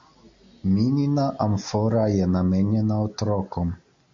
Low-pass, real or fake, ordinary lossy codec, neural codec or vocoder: 7.2 kHz; real; MP3, 48 kbps; none